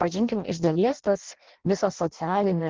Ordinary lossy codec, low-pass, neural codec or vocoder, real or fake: Opus, 16 kbps; 7.2 kHz; codec, 16 kHz in and 24 kHz out, 0.6 kbps, FireRedTTS-2 codec; fake